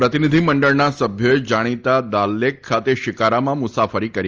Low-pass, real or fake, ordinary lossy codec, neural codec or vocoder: 7.2 kHz; real; Opus, 24 kbps; none